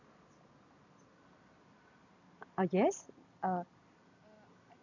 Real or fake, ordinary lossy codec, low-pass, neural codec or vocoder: real; none; 7.2 kHz; none